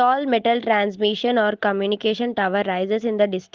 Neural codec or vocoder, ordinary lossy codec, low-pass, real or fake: none; Opus, 16 kbps; 7.2 kHz; real